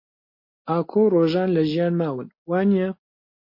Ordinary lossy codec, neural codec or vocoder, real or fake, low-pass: MP3, 32 kbps; none; real; 5.4 kHz